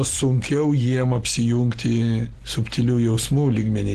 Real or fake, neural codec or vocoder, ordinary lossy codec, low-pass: real; none; Opus, 16 kbps; 14.4 kHz